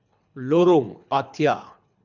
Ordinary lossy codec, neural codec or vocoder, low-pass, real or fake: none; codec, 24 kHz, 3 kbps, HILCodec; 7.2 kHz; fake